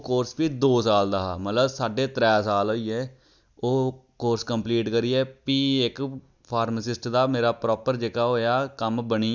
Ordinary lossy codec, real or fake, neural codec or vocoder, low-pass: none; real; none; 7.2 kHz